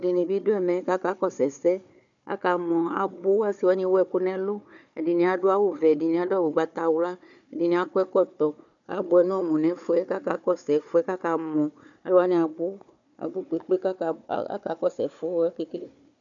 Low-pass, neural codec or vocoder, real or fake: 7.2 kHz; codec, 16 kHz, 4 kbps, FunCodec, trained on Chinese and English, 50 frames a second; fake